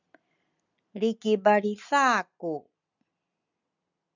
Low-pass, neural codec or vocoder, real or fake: 7.2 kHz; none; real